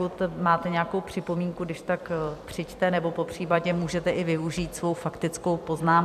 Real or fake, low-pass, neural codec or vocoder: fake; 14.4 kHz; vocoder, 44.1 kHz, 128 mel bands every 256 samples, BigVGAN v2